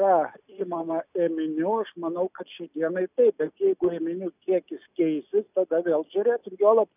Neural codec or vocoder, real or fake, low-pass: none; real; 3.6 kHz